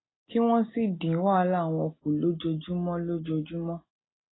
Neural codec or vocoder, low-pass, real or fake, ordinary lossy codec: none; 7.2 kHz; real; AAC, 16 kbps